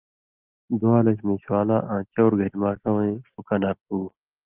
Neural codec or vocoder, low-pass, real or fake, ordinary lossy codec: none; 3.6 kHz; real; Opus, 24 kbps